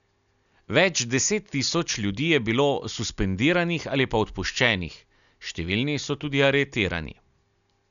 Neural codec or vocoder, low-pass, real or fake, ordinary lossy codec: none; 7.2 kHz; real; none